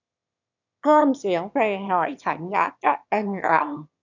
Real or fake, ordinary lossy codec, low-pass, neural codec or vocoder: fake; none; 7.2 kHz; autoencoder, 22.05 kHz, a latent of 192 numbers a frame, VITS, trained on one speaker